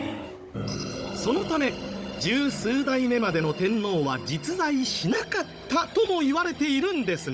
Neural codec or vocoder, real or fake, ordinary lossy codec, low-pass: codec, 16 kHz, 16 kbps, FunCodec, trained on Chinese and English, 50 frames a second; fake; none; none